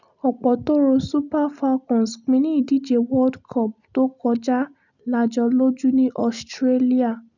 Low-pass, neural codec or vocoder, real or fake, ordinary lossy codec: 7.2 kHz; none; real; none